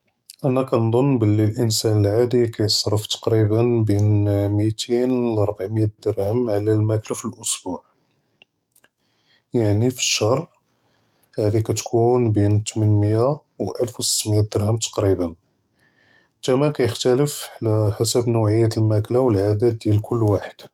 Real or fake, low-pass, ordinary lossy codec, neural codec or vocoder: fake; 19.8 kHz; none; codec, 44.1 kHz, 7.8 kbps, DAC